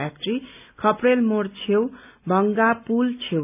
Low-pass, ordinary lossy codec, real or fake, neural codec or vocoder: 3.6 kHz; none; real; none